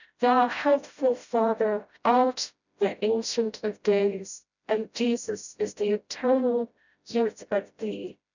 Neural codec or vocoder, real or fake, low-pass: codec, 16 kHz, 0.5 kbps, FreqCodec, smaller model; fake; 7.2 kHz